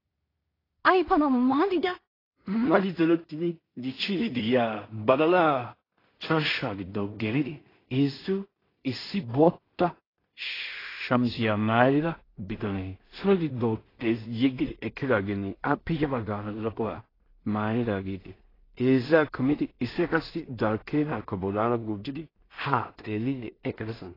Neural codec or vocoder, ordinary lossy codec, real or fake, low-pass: codec, 16 kHz in and 24 kHz out, 0.4 kbps, LongCat-Audio-Codec, two codebook decoder; AAC, 24 kbps; fake; 5.4 kHz